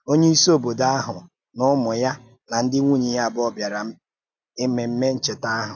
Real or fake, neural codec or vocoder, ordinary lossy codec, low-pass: real; none; AAC, 48 kbps; 7.2 kHz